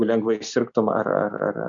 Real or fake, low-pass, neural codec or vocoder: real; 7.2 kHz; none